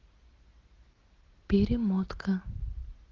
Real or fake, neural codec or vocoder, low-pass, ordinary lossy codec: real; none; 7.2 kHz; Opus, 16 kbps